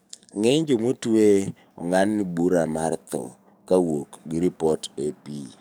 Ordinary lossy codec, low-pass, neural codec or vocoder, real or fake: none; none; codec, 44.1 kHz, 7.8 kbps, DAC; fake